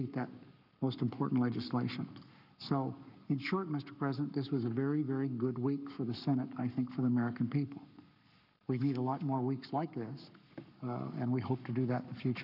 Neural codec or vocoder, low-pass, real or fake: codec, 44.1 kHz, 7.8 kbps, Pupu-Codec; 5.4 kHz; fake